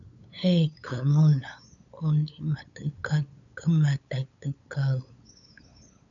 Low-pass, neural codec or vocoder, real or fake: 7.2 kHz; codec, 16 kHz, 8 kbps, FunCodec, trained on LibriTTS, 25 frames a second; fake